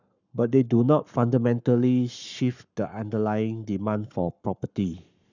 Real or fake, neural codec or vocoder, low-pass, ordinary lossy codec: fake; codec, 44.1 kHz, 7.8 kbps, Pupu-Codec; 7.2 kHz; none